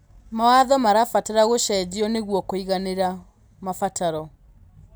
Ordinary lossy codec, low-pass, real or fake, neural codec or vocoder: none; none; real; none